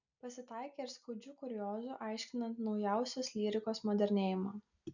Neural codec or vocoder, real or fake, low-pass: none; real; 7.2 kHz